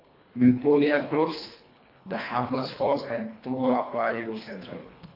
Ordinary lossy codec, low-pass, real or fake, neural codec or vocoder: AAC, 32 kbps; 5.4 kHz; fake; codec, 24 kHz, 1.5 kbps, HILCodec